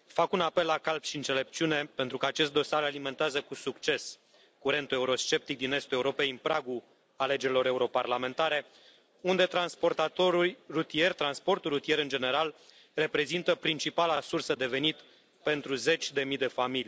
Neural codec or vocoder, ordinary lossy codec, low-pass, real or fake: none; none; none; real